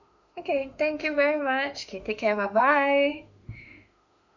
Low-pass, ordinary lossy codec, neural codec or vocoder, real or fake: 7.2 kHz; none; autoencoder, 48 kHz, 32 numbers a frame, DAC-VAE, trained on Japanese speech; fake